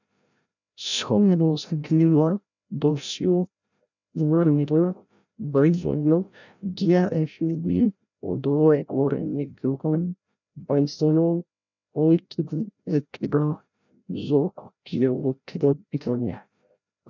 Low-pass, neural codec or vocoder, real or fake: 7.2 kHz; codec, 16 kHz, 0.5 kbps, FreqCodec, larger model; fake